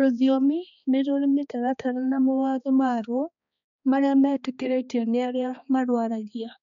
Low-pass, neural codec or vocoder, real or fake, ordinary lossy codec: 7.2 kHz; codec, 16 kHz, 2 kbps, X-Codec, HuBERT features, trained on balanced general audio; fake; none